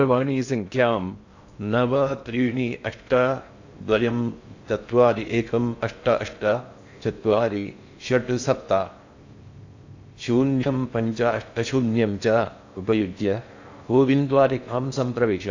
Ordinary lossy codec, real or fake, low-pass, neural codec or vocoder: AAC, 48 kbps; fake; 7.2 kHz; codec, 16 kHz in and 24 kHz out, 0.6 kbps, FocalCodec, streaming, 2048 codes